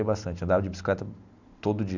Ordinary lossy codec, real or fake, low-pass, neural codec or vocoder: none; real; 7.2 kHz; none